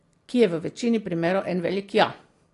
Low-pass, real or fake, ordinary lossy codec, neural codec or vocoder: 10.8 kHz; real; AAC, 48 kbps; none